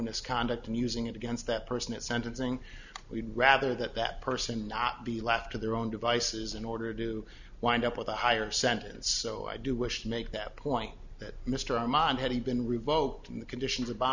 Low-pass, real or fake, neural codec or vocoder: 7.2 kHz; real; none